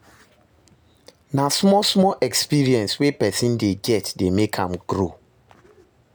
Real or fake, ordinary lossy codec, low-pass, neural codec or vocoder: real; none; none; none